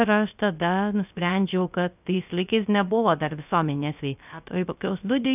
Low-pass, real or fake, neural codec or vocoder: 3.6 kHz; fake; codec, 16 kHz, 0.3 kbps, FocalCodec